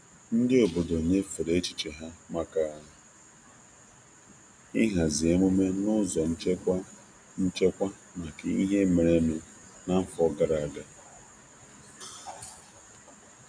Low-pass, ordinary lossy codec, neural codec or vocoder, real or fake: 9.9 kHz; none; none; real